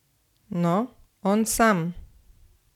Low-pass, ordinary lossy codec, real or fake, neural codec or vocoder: 19.8 kHz; none; real; none